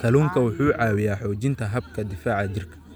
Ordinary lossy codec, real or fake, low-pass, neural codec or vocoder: none; real; none; none